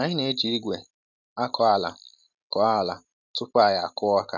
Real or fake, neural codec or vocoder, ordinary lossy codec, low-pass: real; none; none; 7.2 kHz